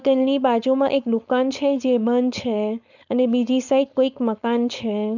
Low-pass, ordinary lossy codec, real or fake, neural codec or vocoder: 7.2 kHz; none; fake; codec, 16 kHz, 4.8 kbps, FACodec